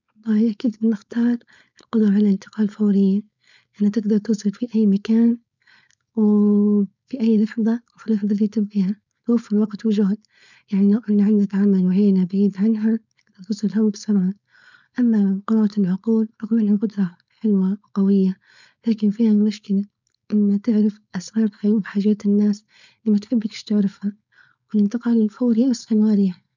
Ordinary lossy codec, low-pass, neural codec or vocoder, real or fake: none; 7.2 kHz; codec, 16 kHz, 4.8 kbps, FACodec; fake